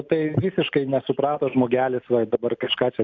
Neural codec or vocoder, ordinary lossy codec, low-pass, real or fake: none; MP3, 64 kbps; 7.2 kHz; real